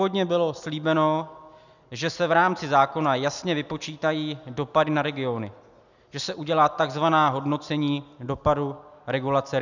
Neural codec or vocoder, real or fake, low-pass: none; real; 7.2 kHz